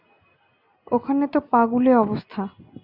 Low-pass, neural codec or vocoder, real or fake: 5.4 kHz; none; real